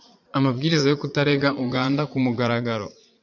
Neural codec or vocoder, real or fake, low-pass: vocoder, 44.1 kHz, 80 mel bands, Vocos; fake; 7.2 kHz